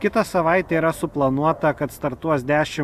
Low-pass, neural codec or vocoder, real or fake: 14.4 kHz; none; real